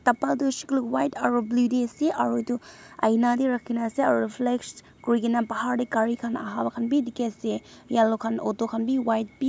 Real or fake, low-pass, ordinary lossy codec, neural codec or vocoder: real; none; none; none